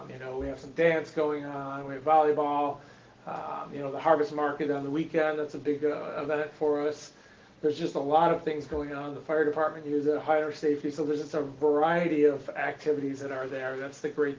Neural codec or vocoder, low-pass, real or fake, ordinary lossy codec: none; 7.2 kHz; real; Opus, 16 kbps